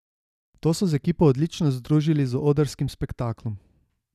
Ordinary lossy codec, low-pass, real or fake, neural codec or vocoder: none; 14.4 kHz; real; none